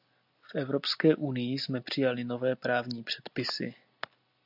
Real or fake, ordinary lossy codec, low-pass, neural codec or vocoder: real; AAC, 48 kbps; 5.4 kHz; none